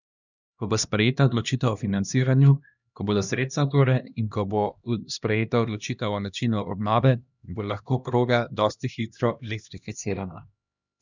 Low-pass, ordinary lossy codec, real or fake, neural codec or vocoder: 7.2 kHz; none; fake; codec, 16 kHz, 1 kbps, X-Codec, HuBERT features, trained on LibriSpeech